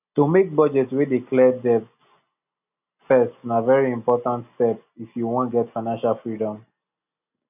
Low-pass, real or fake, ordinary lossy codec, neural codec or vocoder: 3.6 kHz; real; none; none